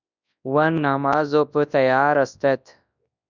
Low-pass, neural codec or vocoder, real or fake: 7.2 kHz; codec, 24 kHz, 0.9 kbps, WavTokenizer, large speech release; fake